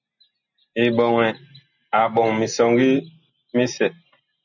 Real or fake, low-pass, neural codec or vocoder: real; 7.2 kHz; none